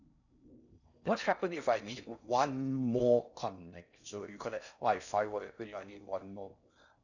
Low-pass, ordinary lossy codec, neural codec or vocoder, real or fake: 7.2 kHz; none; codec, 16 kHz in and 24 kHz out, 0.6 kbps, FocalCodec, streaming, 4096 codes; fake